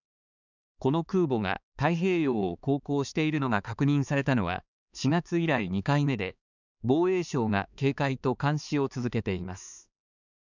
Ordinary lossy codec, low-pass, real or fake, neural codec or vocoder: none; 7.2 kHz; fake; codec, 16 kHz, 4 kbps, X-Codec, HuBERT features, trained on balanced general audio